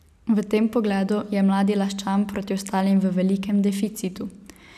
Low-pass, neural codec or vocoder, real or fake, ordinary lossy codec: 14.4 kHz; none; real; none